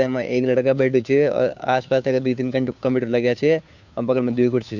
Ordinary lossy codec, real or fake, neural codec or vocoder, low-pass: none; fake; codec, 16 kHz, 2 kbps, FunCodec, trained on Chinese and English, 25 frames a second; 7.2 kHz